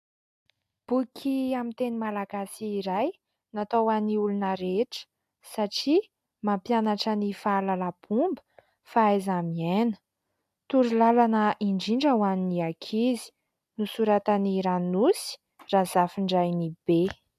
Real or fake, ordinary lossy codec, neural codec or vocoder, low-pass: real; AAC, 96 kbps; none; 14.4 kHz